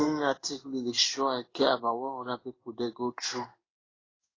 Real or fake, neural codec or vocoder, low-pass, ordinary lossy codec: fake; codec, 16 kHz in and 24 kHz out, 1 kbps, XY-Tokenizer; 7.2 kHz; AAC, 32 kbps